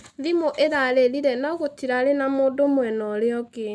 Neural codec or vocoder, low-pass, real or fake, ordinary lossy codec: none; none; real; none